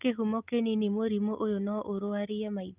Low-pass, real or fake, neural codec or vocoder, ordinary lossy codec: 3.6 kHz; real; none; Opus, 32 kbps